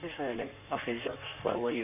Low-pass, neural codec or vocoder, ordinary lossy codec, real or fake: 3.6 kHz; codec, 16 kHz in and 24 kHz out, 1.1 kbps, FireRedTTS-2 codec; none; fake